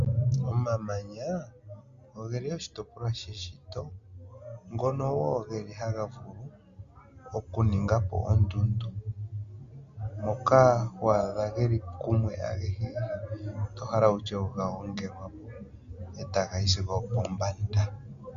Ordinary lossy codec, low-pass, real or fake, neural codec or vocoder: Opus, 64 kbps; 7.2 kHz; real; none